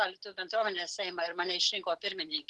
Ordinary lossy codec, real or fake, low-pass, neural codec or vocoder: Opus, 16 kbps; real; 10.8 kHz; none